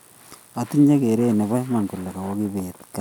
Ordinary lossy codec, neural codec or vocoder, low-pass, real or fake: none; none; 19.8 kHz; real